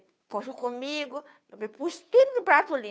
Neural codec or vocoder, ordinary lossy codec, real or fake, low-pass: codec, 16 kHz, 2 kbps, FunCodec, trained on Chinese and English, 25 frames a second; none; fake; none